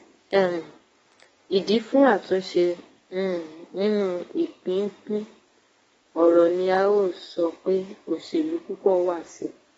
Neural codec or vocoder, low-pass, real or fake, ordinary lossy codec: codec, 32 kHz, 1.9 kbps, SNAC; 14.4 kHz; fake; AAC, 24 kbps